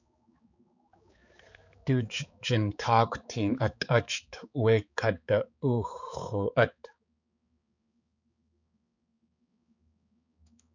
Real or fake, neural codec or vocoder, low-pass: fake; codec, 16 kHz, 4 kbps, X-Codec, HuBERT features, trained on balanced general audio; 7.2 kHz